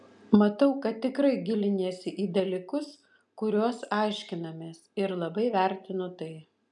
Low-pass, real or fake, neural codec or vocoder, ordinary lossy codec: 10.8 kHz; real; none; MP3, 96 kbps